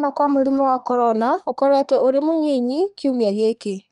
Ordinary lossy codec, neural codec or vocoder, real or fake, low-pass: none; codec, 24 kHz, 1 kbps, SNAC; fake; 10.8 kHz